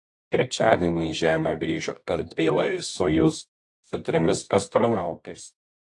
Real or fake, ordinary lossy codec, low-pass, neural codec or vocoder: fake; AAC, 48 kbps; 10.8 kHz; codec, 24 kHz, 0.9 kbps, WavTokenizer, medium music audio release